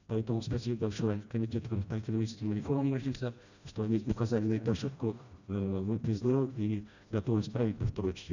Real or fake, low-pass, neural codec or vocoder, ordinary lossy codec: fake; 7.2 kHz; codec, 16 kHz, 1 kbps, FreqCodec, smaller model; none